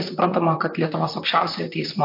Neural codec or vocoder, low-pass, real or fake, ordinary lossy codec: vocoder, 44.1 kHz, 128 mel bands, Pupu-Vocoder; 5.4 kHz; fake; MP3, 32 kbps